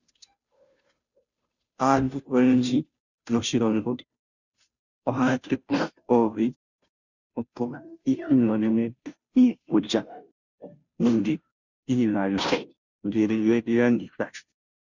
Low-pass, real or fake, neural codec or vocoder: 7.2 kHz; fake; codec, 16 kHz, 0.5 kbps, FunCodec, trained on Chinese and English, 25 frames a second